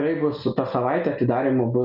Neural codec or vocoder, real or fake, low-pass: none; real; 5.4 kHz